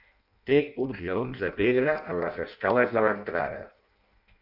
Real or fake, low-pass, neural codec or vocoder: fake; 5.4 kHz; codec, 16 kHz in and 24 kHz out, 0.6 kbps, FireRedTTS-2 codec